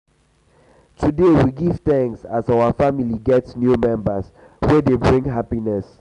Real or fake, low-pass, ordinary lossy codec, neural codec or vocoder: real; 10.8 kHz; none; none